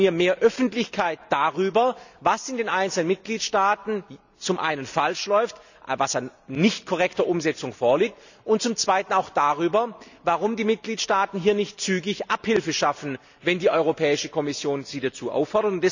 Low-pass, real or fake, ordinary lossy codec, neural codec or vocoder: 7.2 kHz; real; none; none